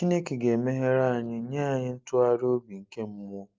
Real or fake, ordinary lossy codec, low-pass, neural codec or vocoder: real; Opus, 32 kbps; 7.2 kHz; none